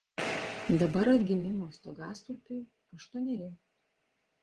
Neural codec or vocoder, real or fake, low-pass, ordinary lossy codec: vocoder, 22.05 kHz, 80 mel bands, WaveNeXt; fake; 9.9 kHz; Opus, 16 kbps